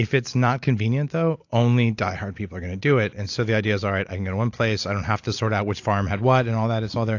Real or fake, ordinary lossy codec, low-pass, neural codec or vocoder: real; AAC, 48 kbps; 7.2 kHz; none